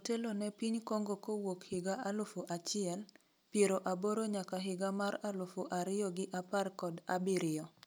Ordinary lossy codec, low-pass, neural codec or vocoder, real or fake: none; none; none; real